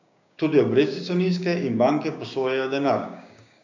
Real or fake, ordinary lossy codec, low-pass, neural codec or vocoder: fake; none; 7.2 kHz; codec, 16 kHz, 6 kbps, DAC